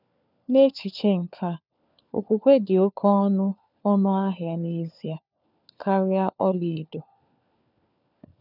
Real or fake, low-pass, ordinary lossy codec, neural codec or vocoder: fake; 5.4 kHz; none; codec, 16 kHz, 4 kbps, FunCodec, trained on LibriTTS, 50 frames a second